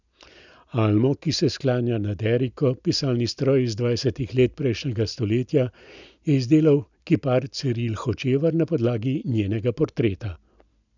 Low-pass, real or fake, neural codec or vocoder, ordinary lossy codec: 7.2 kHz; real; none; none